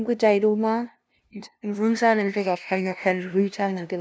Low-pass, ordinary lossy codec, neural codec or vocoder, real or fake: none; none; codec, 16 kHz, 0.5 kbps, FunCodec, trained on LibriTTS, 25 frames a second; fake